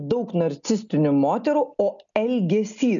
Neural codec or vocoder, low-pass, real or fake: none; 7.2 kHz; real